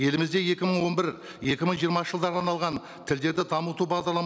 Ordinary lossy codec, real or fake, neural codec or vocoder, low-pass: none; real; none; none